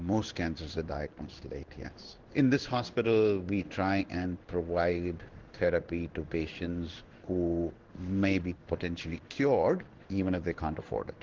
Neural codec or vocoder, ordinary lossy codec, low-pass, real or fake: codec, 16 kHz in and 24 kHz out, 1 kbps, XY-Tokenizer; Opus, 16 kbps; 7.2 kHz; fake